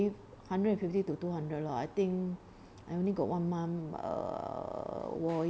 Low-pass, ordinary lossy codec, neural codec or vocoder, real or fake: none; none; none; real